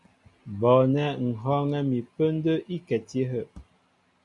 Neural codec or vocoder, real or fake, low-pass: none; real; 10.8 kHz